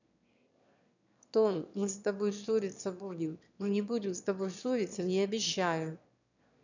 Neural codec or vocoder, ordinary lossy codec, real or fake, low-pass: autoencoder, 22.05 kHz, a latent of 192 numbers a frame, VITS, trained on one speaker; none; fake; 7.2 kHz